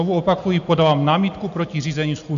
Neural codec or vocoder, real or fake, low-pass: none; real; 7.2 kHz